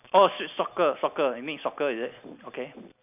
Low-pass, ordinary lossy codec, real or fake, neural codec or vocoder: 3.6 kHz; none; real; none